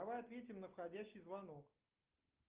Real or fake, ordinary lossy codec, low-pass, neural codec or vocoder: real; Opus, 32 kbps; 3.6 kHz; none